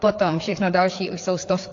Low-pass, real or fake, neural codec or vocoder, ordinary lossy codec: 7.2 kHz; fake; codec, 16 kHz, 4 kbps, FreqCodec, larger model; AAC, 48 kbps